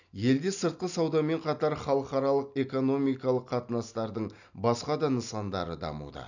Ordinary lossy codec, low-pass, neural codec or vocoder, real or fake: none; 7.2 kHz; none; real